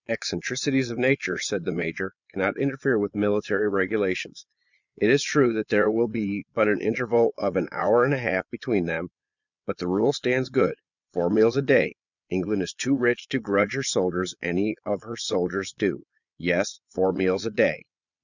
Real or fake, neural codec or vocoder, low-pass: fake; vocoder, 22.05 kHz, 80 mel bands, Vocos; 7.2 kHz